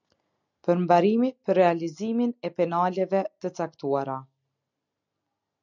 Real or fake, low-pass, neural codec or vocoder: real; 7.2 kHz; none